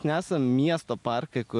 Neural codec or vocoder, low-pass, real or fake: none; 10.8 kHz; real